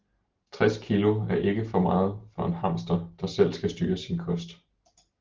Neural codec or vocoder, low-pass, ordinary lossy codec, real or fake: none; 7.2 kHz; Opus, 16 kbps; real